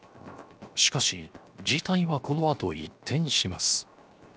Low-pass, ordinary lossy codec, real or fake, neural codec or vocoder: none; none; fake; codec, 16 kHz, 0.7 kbps, FocalCodec